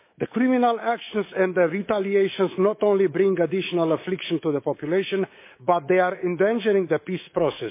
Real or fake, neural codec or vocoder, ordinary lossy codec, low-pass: fake; codec, 24 kHz, 3.1 kbps, DualCodec; MP3, 24 kbps; 3.6 kHz